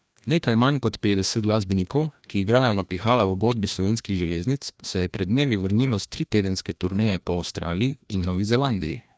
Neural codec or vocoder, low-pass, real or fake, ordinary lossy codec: codec, 16 kHz, 1 kbps, FreqCodec, larger model; none; fake; none